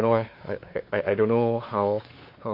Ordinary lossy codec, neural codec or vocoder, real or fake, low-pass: MP3, 32 kbps; codec, 16 kHz, 4 kbps, X-Codec, WavLM features, trained on Multilingual LibriSpeech; fake; 5.4 kHz